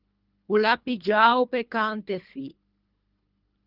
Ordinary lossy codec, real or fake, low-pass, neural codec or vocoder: Opus, 24 kbps; fake; 5.4 kHz; codec, 24 kHz, 3 kbps, HILCodec